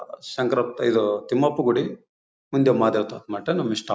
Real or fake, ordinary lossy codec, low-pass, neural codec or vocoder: real; none; none; none